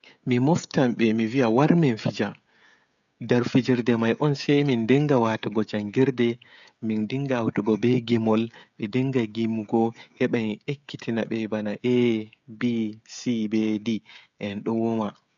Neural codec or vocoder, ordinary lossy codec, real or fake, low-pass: codec, 16 kHz, 16 kbps, FreqCodec, smaller model; none; fake; 7.2 kHz